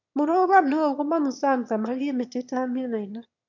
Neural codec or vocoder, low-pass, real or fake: autoencoder, 22.05 kHz, a latent of 192 numbers a frame, VITS, trained on one speaker; 7.2 kHz; fake